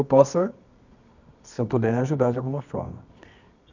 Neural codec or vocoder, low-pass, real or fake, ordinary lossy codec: codec, 24 kHz, 0.9 kbps, WavTokenizer, medium music audio release; 7.2 kHz; fake; none